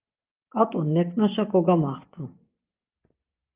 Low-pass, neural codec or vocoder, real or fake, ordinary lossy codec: 3.6 kHz; vocoder, 24 kHz, 100 mel bands, Vocos; fake; Opus, 32 kbps